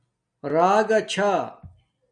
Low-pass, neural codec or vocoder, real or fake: 9.9 kHz; none; real